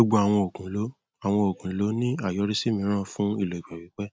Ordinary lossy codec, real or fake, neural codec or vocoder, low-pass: none; real; none; none